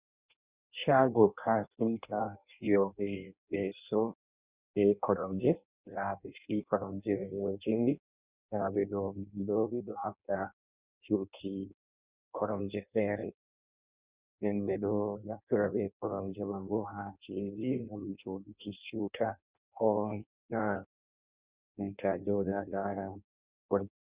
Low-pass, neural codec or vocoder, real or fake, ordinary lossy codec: 3.6 kHz; codec, 16 kHz in and 24 kHz out, 0.6 kbps, FireRedTTS-2 codec; fake; Opus, 64 kbps